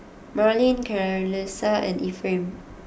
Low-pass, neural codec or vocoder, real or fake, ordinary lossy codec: none; none; real; none